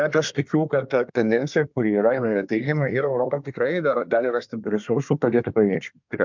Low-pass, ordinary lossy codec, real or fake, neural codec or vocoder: 7.2 kHz; MP3, 64 kbps; fake; codec, 24 kHz, 1 kbps, SNAC